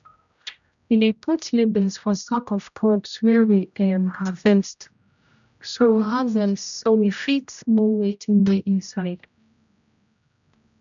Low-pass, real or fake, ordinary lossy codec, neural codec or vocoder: 7.2 kHz; fake; MP3, 96 kbps; codec, 16 kHz, 0.5 kbps, X-Codec, HuBERT features, trained on general audio